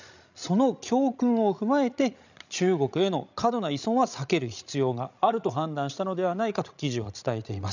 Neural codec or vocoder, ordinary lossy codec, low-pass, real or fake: codec, 16 kHz, 16 kbps, FreqCodec, larger model; none; 7.2 kHz; fake